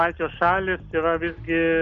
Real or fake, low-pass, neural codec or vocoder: real; 7.2 kHz; none